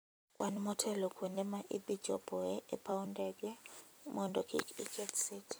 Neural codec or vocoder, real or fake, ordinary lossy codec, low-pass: vocoder, 44.1 kHz, 128 mel bands every 256 samples, BigVGAN v2; fake; none; none